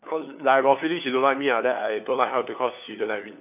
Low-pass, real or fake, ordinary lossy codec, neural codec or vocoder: 3.6 kHz; fake; none; codec, 16 kHz, 4 kbps, FunCodec, trained on LibriTTS, 50 frames a second